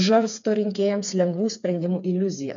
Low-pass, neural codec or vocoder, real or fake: 7.2 kHz; codec, 16 kHz, 4 kbps, FreqCodec, smaller model; fake